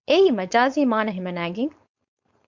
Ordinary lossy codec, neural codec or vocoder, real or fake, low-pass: MP3, 64 kbps; codec, 16 kHz, 4.8 kbps, FACodec; fake; 7.2 kHz